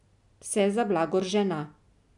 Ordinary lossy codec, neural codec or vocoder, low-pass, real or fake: none; none; 10.8 kHz; real